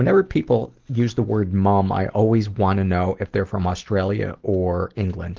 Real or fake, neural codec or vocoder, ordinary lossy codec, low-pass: real; none; Opus, 16 kbps; 7.2 kHz